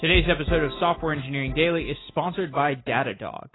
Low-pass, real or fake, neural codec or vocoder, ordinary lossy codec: 7.2 kHz; real; none; AAC, 16 kbps